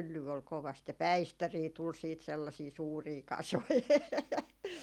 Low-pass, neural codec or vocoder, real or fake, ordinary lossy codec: 19.8 kHz; none; real; Opus, 32 kbps